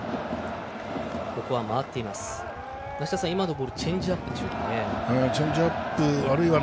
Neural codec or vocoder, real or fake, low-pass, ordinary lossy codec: none; real; none; none